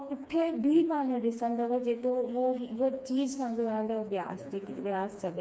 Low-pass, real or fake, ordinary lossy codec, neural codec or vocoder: none; fake; none; codec, 16 kHz, 2 kbps, FreqCodec, smaller model